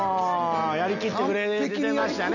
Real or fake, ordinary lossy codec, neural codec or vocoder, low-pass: real; none; none; 7.2 kHz